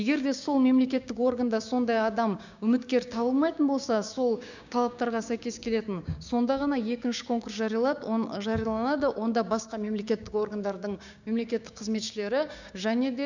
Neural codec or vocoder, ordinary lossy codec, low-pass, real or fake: codec, 16 kHz, 6 kbps, DAC; none; 7.2 kHz; fake